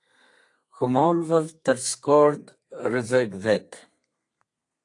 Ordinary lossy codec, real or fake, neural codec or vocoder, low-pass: AAC, 48 kbps; fake; codec, 32 kHz, 1.9 kbps, SNAC; 10.8 kHz